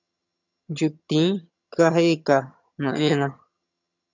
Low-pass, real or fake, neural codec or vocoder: 7.2 kHz; fake; vocoder, 22.05 kHz, 80 mel bands, HiFi-GAN